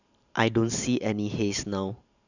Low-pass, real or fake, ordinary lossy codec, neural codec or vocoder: 7.2 kHz; fake; none; vocoder, 44.1 kHz, 128 mel bands every 512 samples, BigVGAN v2